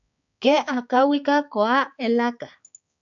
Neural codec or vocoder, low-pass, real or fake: codec, 16 kHz, 4 kbps, X-Codec, HuBERT features, trained on balanced general audio; 7.2 kHz; fake